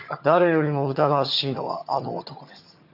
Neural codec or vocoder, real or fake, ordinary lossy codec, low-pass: vocoder, 22.05 kHz, 80 mel bands, HiFi-GAN; fake; none; 5.4 kHz